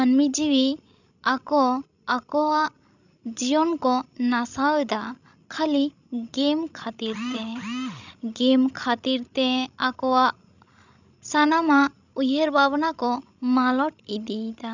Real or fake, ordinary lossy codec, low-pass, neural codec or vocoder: fake; none; 7.2 kHz; codec, 16 kHz, 16 kbps, FreqCodec, larger model